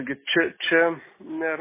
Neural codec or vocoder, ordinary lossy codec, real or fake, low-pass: none; MP3, 16 kbps; real; 3.6 kHz